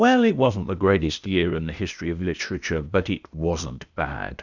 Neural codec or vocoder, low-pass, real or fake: codec, 16 kHz, 0.8 kbps, ZipCodec; 7.2 kHz; fake